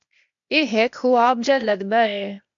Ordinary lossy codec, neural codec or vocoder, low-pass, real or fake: AAC, 64 kbps; codec, 16 kHz, 0.8 kbps, ZipCodec; 7.2 kHz; fake